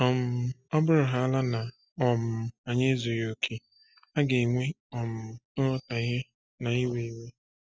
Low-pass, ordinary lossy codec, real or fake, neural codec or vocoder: none; none; real; none